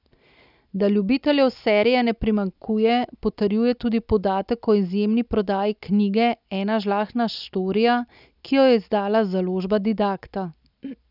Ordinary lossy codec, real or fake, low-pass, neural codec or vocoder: none; real; 5.4 kHz; none